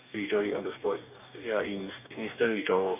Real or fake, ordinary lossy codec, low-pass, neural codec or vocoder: fake; none; 3.6 kHz; codec, 44.1 kHz, 2.6 kbps, DAC